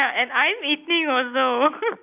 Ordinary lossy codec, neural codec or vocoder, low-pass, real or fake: none; none; 3.6 kHz; real